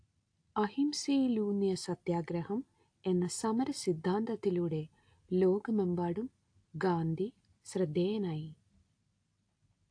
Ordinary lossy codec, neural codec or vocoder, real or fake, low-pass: AAC, 48 kbps; none; real; 9.9 kHz